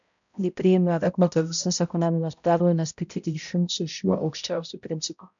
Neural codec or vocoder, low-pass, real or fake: codec, 16 kHz, 0.5 kbps, X-Codec, HuBERT features, trained on balanced general audio; 7.2 kHz; fake